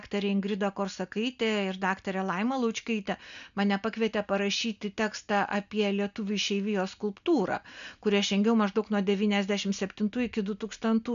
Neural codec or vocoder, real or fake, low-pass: none; real; 7.2 kHz